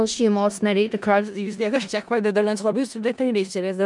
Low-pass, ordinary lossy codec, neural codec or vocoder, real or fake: 10.8 kHz; MP3, 96 kbps; codec, 16 kHz in and 24 kHz out, 0.4 kbps, LongCat-Audio-Codec, four codebook decoder; fake